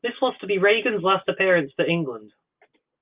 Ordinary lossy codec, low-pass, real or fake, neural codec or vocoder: Opus, 24 kbps; 3.6 kHz; real; none